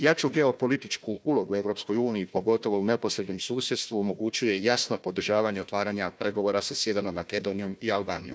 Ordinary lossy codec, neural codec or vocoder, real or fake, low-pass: none; codec, 16 kHz, 1 kbps, FunCodec, trained on Chinese and English, 50 frames a second; fake; none